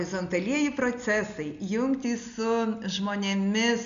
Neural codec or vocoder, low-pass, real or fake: none; 7.2 kHz; real